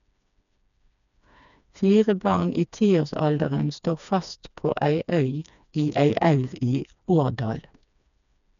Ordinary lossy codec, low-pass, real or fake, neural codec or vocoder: none; 7.2 kHz; fake; codec, 16 kHz, 2 kbps, FreqCodec, smaller model